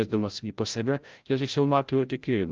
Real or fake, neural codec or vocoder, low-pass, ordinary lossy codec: fake; codec, 16 kHz, 0.5 kbps, FreqCodec, larger model; 7.2 kHz; Opus, 24 kbps